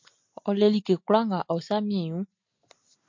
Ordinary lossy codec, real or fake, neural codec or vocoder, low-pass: MP3, 48 kbps; real; none; 7.2 kHz